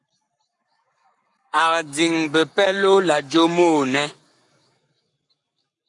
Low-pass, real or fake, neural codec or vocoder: 10.8 kHz; fake; codec, 44.1 kHz, 7.8 kbps, Pupu-Codec